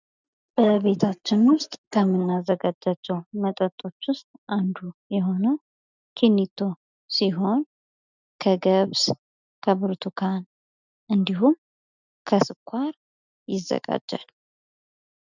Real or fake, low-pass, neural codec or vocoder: real; 7.2 kHz; none